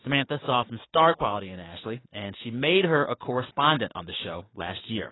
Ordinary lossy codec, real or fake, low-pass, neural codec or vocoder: AAC, 16 kbps; fake; 7.2 kHz; codec, 16 kHz, 6 kbps, DAC